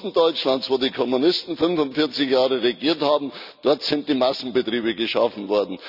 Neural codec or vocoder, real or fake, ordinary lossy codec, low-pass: none; real; none; 5.4 kHz